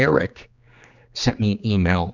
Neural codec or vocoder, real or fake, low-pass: codec, 16 kHz, 2 kbps, X-Codec, HuBERT features, trained on general audio; fake; 7.2 kHz